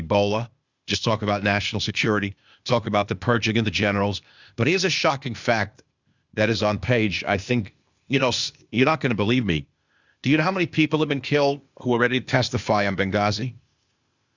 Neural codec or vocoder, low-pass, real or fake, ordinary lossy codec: codec, 16 kHz, 0.8 kbps, ZipCodec; 7.2 kHz; fake; Opus, 64 kbps